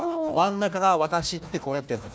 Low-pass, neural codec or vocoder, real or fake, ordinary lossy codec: none; codec, 16 kHz, 1 kbps, FunCodec, trained on Chinese and English, 50 frames a second; fake; none